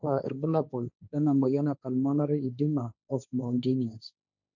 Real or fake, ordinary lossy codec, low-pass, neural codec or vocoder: fake; none; 7.2 kHz; codec, 16 kHz, 1.1 kbps, Voila-Tokenizer